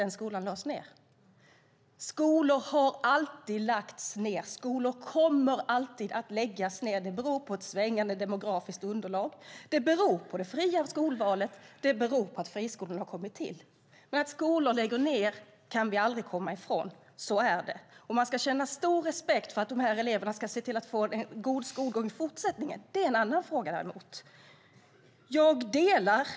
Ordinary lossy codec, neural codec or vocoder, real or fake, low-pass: none; none; real; none